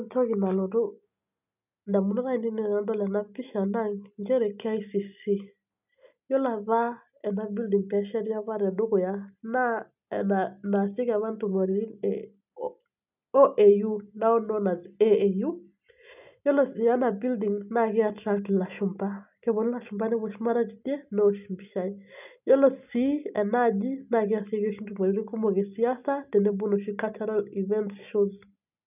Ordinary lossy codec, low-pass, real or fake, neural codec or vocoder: none; 3.6 kHz; real; none